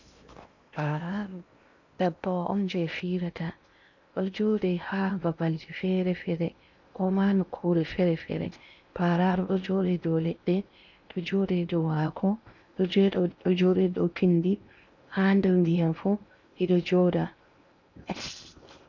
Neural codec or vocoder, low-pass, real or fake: codec, 16 kHz in and 24 kHz out, 0.8 kbps, FocalCodec, streaming, 65536 codes; 7.2 kHz; fake